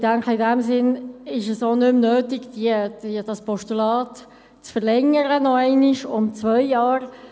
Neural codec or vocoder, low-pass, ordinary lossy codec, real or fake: none; none; none; real